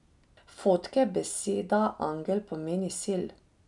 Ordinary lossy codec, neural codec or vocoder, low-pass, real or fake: none; none; 10.8 kHz; real